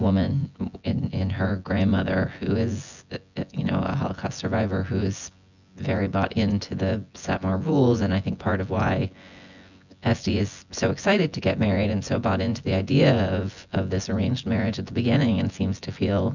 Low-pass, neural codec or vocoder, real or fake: 7.2 kHz; vocoder, 24 kHz, 100 mel bands, Vocos; fake